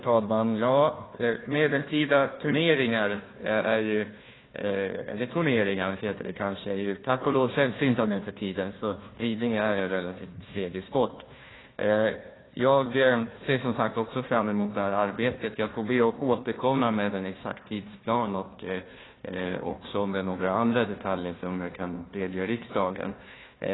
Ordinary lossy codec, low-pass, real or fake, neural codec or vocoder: AAC, 16 kbps; 7.2 kHz; fake; codec, 16 kHz, 1 kbps, FunCodec, trained on Chinese and English, 50 frames a second